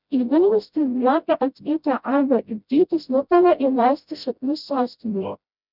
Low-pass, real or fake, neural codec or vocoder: 5.4 kHz; fake; codec, 16 kHz, 0.5 kbps, FreqCodec, smaller model